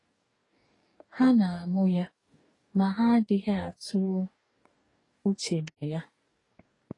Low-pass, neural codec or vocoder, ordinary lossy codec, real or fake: 10.8 kHz; codec, 44.1 kHz, 2.6 kbps, DAC; AAC, 32 kbps; fake